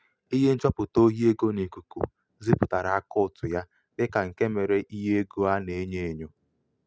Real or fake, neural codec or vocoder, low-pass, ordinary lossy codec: real; none; none; none